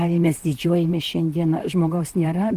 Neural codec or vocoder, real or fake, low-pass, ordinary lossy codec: vocoder, 44.1 kHz, 128 mel bands, Pupu-Vocoder; fake; 14.4 kHz; Opus, 24 kbps